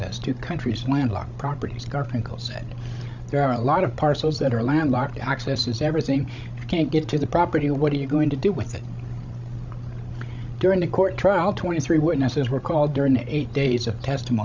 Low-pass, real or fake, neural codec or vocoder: 7.2 kHz; fake; codec, 16 kHz, 16 kbps, FreqCodec, larger model